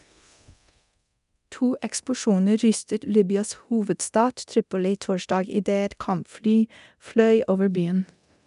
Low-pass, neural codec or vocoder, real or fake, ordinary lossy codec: 10.8 kHz; codec, 24 kHz, 0.9 kbps, DualCodec; fake; none